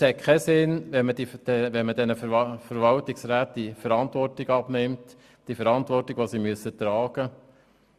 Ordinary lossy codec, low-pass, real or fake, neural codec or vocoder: Opus, 64 kbps; 14.4 kHz; real; none